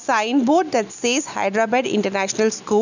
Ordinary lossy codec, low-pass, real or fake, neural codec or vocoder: none; 7.2 kHz; real; none